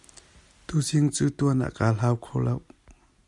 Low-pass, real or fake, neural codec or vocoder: 10.8 kHz; real; none